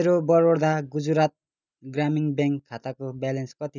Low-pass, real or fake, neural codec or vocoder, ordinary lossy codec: 7.2 kHz; real; none; none